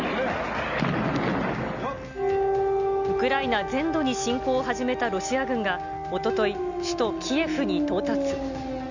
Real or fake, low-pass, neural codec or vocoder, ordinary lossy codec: real; 7.2 kHz; none; none